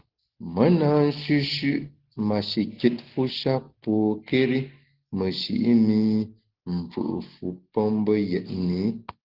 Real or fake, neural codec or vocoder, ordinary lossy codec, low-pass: real; none; Opus, 16 kbps; 5.4 kHz